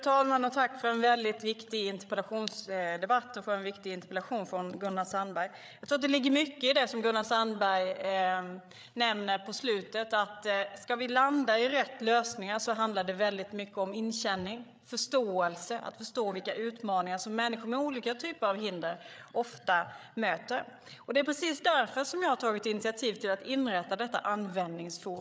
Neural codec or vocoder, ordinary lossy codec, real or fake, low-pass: codec, 16 kHz, 8 kbps, FreqCodec, larger model; none; fake; none